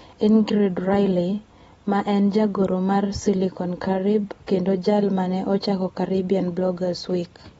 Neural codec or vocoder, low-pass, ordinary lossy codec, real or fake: vocoder, 44.1 kHz, 128 mel bands every 256 samples, BigVGAN v2; 19.8 kHz; AAC, 24 kbps; fake